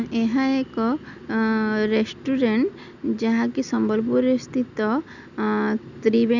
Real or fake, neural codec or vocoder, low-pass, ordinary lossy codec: real; none; 7.2 kHz; none